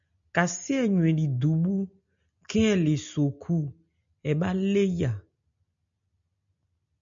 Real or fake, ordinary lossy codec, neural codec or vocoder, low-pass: real; MP3, 64 kbps; none; 7.2 kHz